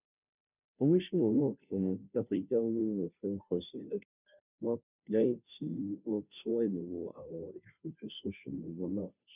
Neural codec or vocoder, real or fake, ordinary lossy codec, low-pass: codec, 16 kHz, 0.5 kbps, FunCodec, trained on Chinese and English, 25 frames a second; fake; none; 3.6 kHz